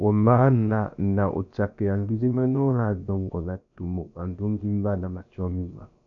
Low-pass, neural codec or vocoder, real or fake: 7.2 kHz; codec, 16 kHz, about 1 kbps, DyCAST, with the encoder's durations; fake